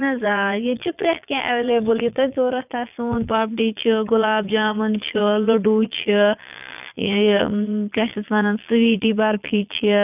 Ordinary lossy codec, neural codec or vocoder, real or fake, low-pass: none; vocoder, 22.05 kHz, 80 mel bands, Vocos; fake; 3.6 kHz